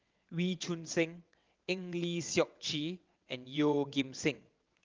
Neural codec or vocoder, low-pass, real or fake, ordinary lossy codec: vocoder, 22.05 kHz, 80 mel bands, WaveNeXt; 7.2 kHz; fake; Opus, 32 kbps